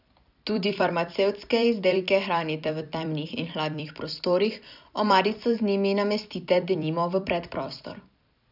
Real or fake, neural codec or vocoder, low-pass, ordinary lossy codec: fake; vocoder, 44.1 kHz, 128 mel bands every 256 samples, BigVGAN v2; 5.4 kHz; none